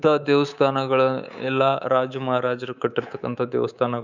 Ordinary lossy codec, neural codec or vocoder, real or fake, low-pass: none; codec, 24 kHz, 3.1 kbps, DualCodec; fake; 7.2 kHz